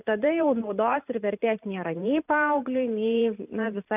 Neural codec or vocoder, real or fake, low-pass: vocoder, 44.1 kHz, 128 mel bands every 512 samples, BigVGAN v2; fake; 3.6 kHz